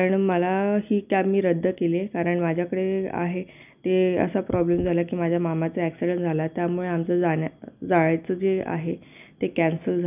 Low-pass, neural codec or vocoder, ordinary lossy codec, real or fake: 3.6 kHz; none; none; real